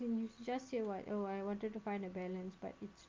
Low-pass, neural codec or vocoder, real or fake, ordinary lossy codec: 7.2 kHz; none; real; Opus, 24 kbps